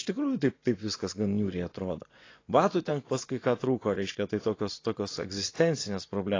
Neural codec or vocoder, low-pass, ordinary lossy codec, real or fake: vocoder, 24 kHz, 100 mel bands, Vocos; 7.2 kHz; AAC, 32 kbps; fake